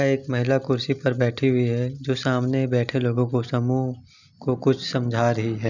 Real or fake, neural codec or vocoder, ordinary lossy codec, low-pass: real; none; none; 7.2 kHz